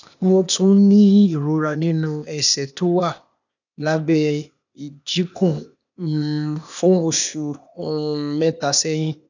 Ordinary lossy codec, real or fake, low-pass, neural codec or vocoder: none; fake; 7.2 kHz; codec, 16 kHz, 0.8 kbps, ZipCodec